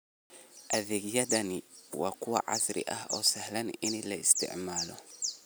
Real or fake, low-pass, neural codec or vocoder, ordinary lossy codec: real; none; none; none